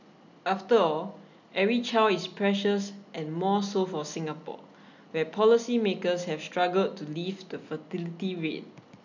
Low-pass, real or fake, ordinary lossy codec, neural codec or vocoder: 7.2 kHz; real; none; none